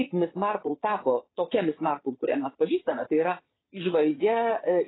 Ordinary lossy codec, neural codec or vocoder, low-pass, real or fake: AAC, 16 kbps; vocoder, 22.05 kHz, 80 mel bands, Vocos; 7.2 kHz; fake